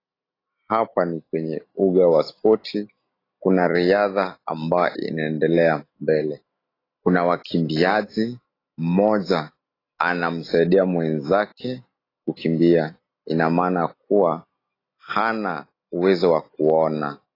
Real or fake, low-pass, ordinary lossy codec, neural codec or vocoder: real; 5.4 kHz; AAC, 24 kbps; none